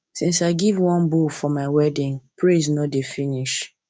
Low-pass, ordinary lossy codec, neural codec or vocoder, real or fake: none; none; codec, 16 kHz, 6 kbps, DAC; fake